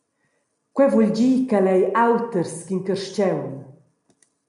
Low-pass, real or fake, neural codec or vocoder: 10.8 kHz; real; none